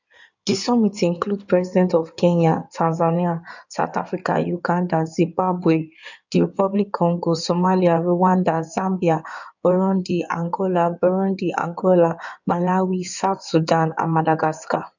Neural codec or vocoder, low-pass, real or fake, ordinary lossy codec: codec, 16 kHz in and 24 kHz out, 2.2 kbps, FireRedTTS-2 codec; 7.2 kHz; fake; none